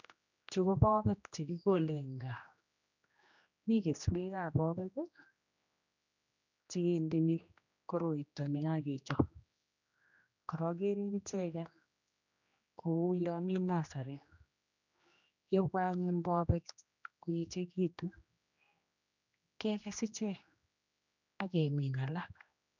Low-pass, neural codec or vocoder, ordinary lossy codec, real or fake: 7.2 kHz; codec, 16 kHz, 1 kbps, X-Codec, HuBERT features, trained on general audio; none; fake